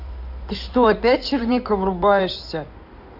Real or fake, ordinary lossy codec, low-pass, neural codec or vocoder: fake; none; 5.4 kHz; codec, 16 kHz in and 24 kHz out, 2.2 kbps, FireRedTTS-2 codec